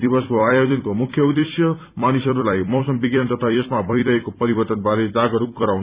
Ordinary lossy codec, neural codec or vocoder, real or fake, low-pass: Opus, 64 kbps; vocoder, 44.1 kHz, 128 mel bands every 256 samples, BigVGAN v2; fake; 3.6 kHz